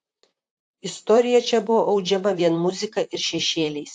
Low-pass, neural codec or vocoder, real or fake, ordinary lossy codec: 10.8 kHz; vocoder, 44.1 kHz, 128 mel bands, Pupu-Vocoder; fake; AAC, 64 kbps